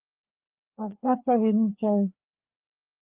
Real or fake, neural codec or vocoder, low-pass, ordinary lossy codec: fake; codec, 16 kHz, 2 kbps, FreqCodec, larger model; 3.6 kHz; Opus, 24 kbps